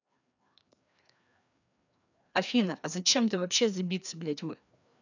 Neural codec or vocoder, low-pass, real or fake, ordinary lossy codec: codec, 16 kHz, 2 kbps, FreqCodec, larger model; 7.2 kHz; fake; none